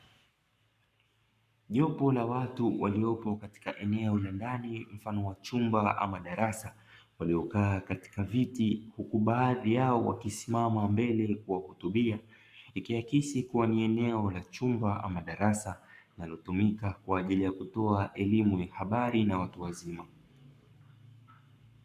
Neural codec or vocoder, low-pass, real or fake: codec, 44.1 kHz, 7.8 kbps, Pupu-Codec; 14.4 kHz; fake